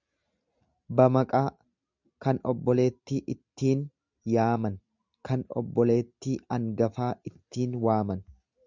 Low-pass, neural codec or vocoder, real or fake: 7.2 kHz; none; real